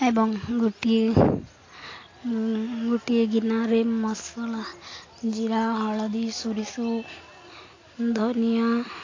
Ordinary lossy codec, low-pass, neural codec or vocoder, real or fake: AAC, 32 kbps; 7.2 kHz; none; real